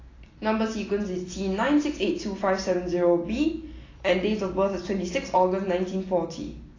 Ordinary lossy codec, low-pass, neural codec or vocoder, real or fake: AAC, 32 kbps; 7.2 kHz; none; real